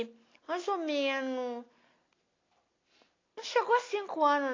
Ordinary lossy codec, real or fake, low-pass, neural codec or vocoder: AAC, 32 kbps; real; 7.2 kHz; none